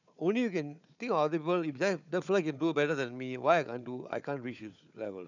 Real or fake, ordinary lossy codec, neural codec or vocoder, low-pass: fake; none; codec, 16 kHz, 4 kbps, FunCodec, trained on Chinese and English, 50 frames a second; 7.2 kHz